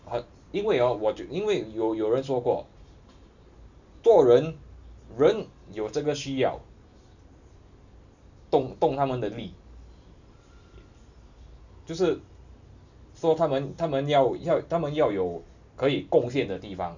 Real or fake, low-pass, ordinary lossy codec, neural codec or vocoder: real; 7.2 kHz; none; none